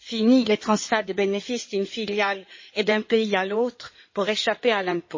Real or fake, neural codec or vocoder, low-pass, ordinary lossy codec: fake; codec, 16 kHz in and 24 kHz out, 2.2 kbps, FireRedTTS-2 codec; 7.2 kHz; MP3, 32 kbps